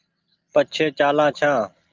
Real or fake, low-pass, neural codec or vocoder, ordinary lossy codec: real; 7.2 kHz; none; Opus, 32 kbps